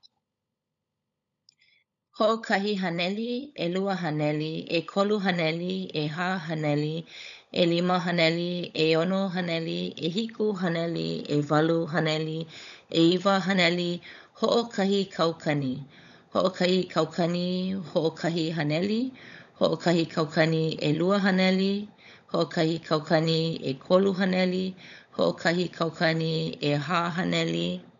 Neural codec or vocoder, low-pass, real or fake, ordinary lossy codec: codec, 16 kHz, 16 kbps, FunCodec, trained on LibriTTS, 50 frames a second; 7.2 kHz; fake; none